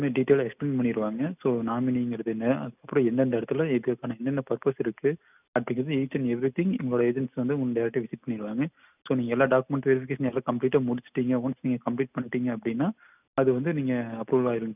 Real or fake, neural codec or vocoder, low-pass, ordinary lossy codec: real; none; 3.6 kHz; none